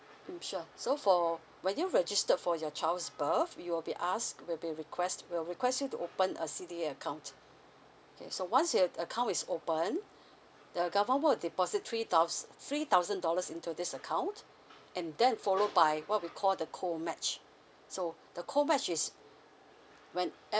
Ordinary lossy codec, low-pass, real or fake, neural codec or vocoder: none; none; real; none